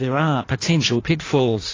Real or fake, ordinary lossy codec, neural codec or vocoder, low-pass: fake; AAC, 32 kbps; codec, 16 kHz in and 24 kHz out, 1.1 kbps, FireRedTTS-2 codec; 7.2 kHz